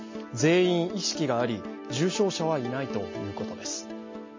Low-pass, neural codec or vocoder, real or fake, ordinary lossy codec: 7.2 kHz; none; real; MP3, 32 kbps